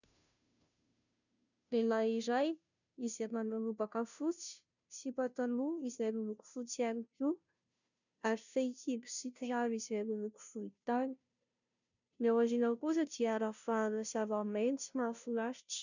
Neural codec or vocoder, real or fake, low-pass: codec, 16 kHz, 0.5 kbps, FunCodec, trained on Chinese and English, 25 frames a second; fake; 7.2 kHz